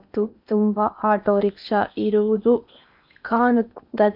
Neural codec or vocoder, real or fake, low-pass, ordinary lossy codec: codec, 16 kHz in and 24 kHz out, 0.8 kbps, FocalCodec, streaming, 65536 codes; fake; 5.4 kHz; none